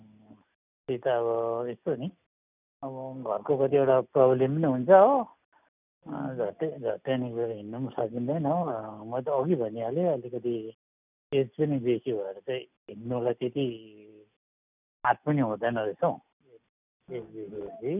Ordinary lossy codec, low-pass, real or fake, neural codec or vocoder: none; 3.6 kHz; real; none